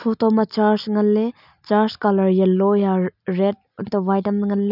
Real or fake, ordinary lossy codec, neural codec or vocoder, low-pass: real; none; none; 5.4 kHz